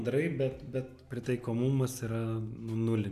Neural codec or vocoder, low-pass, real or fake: none; 14.4 kHz; real